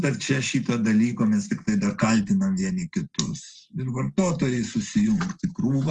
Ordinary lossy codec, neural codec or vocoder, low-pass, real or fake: Opus, 24 kbps; none; 10.8 kHz; real